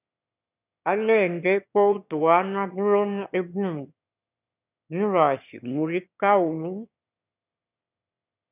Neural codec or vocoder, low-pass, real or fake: autoencoder, 22.05 kHz, a latent of 192 numbers a frame, VITS, trained on one speaker; 3.6 kHz; fake